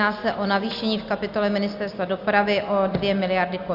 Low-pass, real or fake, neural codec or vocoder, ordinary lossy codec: 5.4 kHz; real; none; Opus, 64 kbps